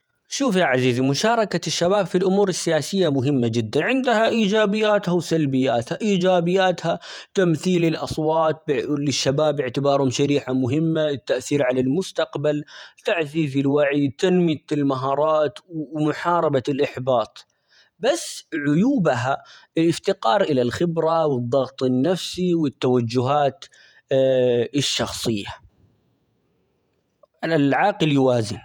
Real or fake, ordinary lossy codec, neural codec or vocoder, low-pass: fake; none; vocoder, 48 kHz, 128 mel bands, Vocos; 19.8 kHz